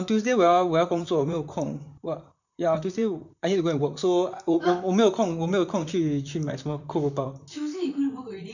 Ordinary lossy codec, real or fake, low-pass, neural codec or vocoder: none; fake; 7.2 kHz; vocoder, 44.1 kHz, 128 mel bands, Pupu-Vocoder